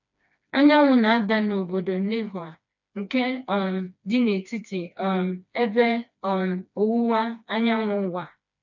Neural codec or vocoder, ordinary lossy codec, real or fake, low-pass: codec, 16 kHz, 2 kbps, FreqCodec, smaller model; none; fake; 7.2 kHz